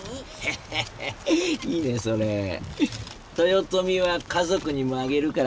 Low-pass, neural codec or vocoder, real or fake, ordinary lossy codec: none; none; real; none